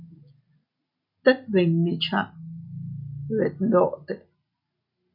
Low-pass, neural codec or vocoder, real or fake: 5.4 kHz; none; real